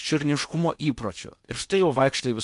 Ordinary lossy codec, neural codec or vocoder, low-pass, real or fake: MP3, 64 kbps; codec, 16 kHz in and 24 kHz out, 0.8 kbps, FocalCodec, streaming, 65536 codes; 10.8 kHz; fake